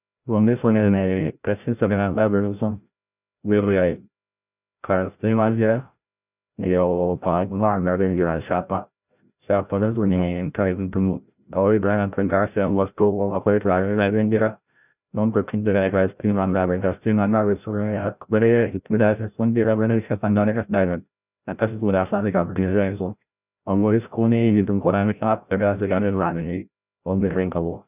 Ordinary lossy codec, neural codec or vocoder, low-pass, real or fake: none; codec, 16 kHz, 0.5 kbps, FreqCodec, larger model; 3.6 kHz; fake